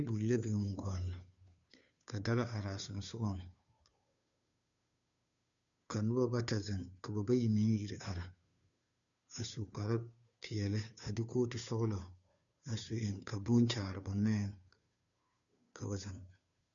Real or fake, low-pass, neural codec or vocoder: fake; 7.2 kHz; codec, 16 kHz, 2 kbps, FunCodec, trained on Chinese and English, 25 frames a second